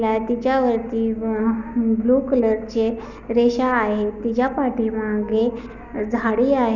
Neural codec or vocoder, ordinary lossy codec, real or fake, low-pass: none; none; real; 7.2 kHz